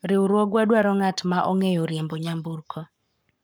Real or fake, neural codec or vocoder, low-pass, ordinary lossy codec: fake; codec, 44.1 kHz, 7.8 kbps, Pupu-Codec; none; none